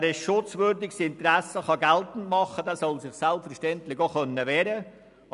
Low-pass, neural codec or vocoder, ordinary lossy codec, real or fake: 10.8 kHz; none; none; real